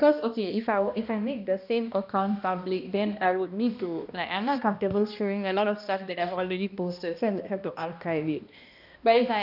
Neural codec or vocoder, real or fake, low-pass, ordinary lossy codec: codec, 16 kHz, 1 kbps, X-Codec, HuBERT features, trained on balanced general audio; fake; 5.4 kHz; none